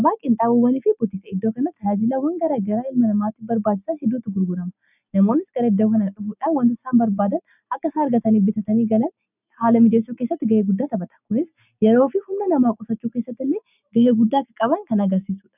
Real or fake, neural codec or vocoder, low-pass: real; none; 3.6 kHz